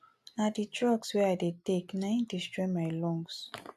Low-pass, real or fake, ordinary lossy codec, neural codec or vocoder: 14.4 kHz; real; AAC, 96 kbps; none